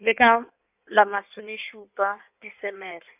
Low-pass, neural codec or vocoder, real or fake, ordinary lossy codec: 3.6 kHz; codec, 16 kHz in and 24 kHz out, 1.1 kbps, FireRedTTS-2 codec; fake; none